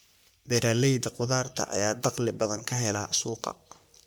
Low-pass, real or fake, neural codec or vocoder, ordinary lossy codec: none; fake; codec, 44.1 kHz, 3.4 kbps, Pupu-Codec; none